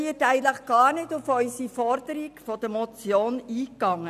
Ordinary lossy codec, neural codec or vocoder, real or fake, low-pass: none; none; real; 14.4 kHz